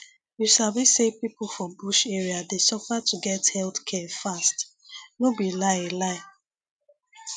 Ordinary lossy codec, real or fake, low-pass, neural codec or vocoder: none; real; 9.9 kHz; none